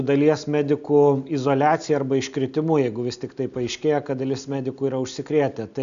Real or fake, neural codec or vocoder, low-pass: real; none; 7.2 kHz